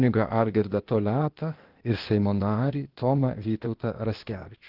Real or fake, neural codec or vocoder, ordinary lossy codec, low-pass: fake; codec, 16 kHz, 0.8 kbps, ZipCodec; Opus, 16 kbps; 5.4 kHz